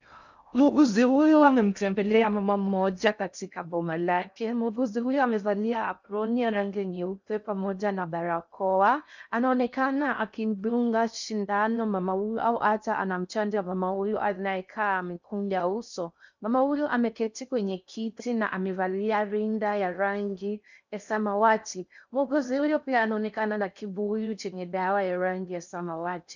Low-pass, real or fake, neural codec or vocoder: 7.2 kHz; fake; codec, 16 kHz in and 24 kHz out, 0.6 kbps, FocalCodec, streaming, 2048 codes